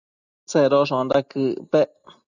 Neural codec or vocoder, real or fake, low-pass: none; real; 7.2 kHz